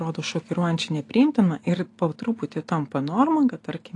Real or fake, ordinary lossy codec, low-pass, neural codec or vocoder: real; AAC, 48 kbps; 10.8 kHz; none